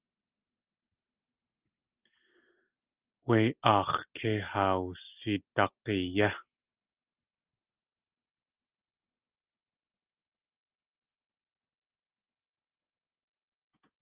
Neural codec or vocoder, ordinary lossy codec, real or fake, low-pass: none; Opus, 24 kbps; real; 3.6 kHz